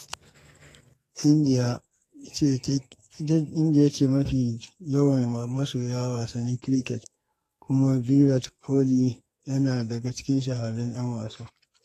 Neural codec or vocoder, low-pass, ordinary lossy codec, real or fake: codec, 44.1 kHz, 2.6 kbps, SNAC; 14.4 kHz; AAC, 48 kbps; fake